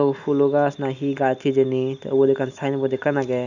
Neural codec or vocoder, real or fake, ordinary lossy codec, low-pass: none; real; none; 7.2 kHz